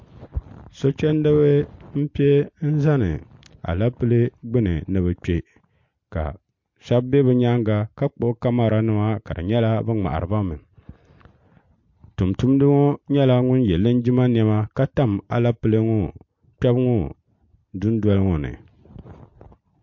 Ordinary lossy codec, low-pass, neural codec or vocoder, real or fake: MP3, 48 kbps; 7.2 kHz; none; real